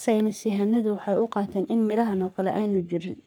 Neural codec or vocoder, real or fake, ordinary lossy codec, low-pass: codec, 44.1 kHz, 3.4 kbps, Pupu-Codec; fake; none; none